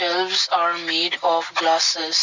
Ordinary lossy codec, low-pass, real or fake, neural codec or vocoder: none; 7.2 kHz; real; none